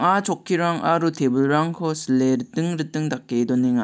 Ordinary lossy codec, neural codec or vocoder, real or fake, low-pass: none; none; real; none